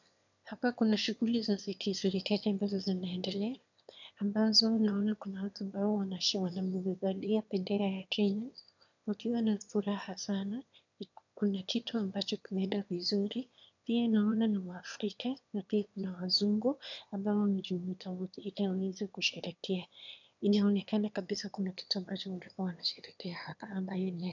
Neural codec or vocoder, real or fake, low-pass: autoencoder, 22.05 kHz, a latent of 192 numbers a frame, VITS, trained on one speaker; fake; 7.2 kHz